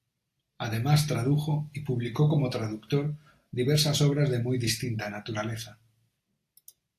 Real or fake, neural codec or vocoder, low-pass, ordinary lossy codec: real; none; 14.4 kHz; AAC, 64 kbps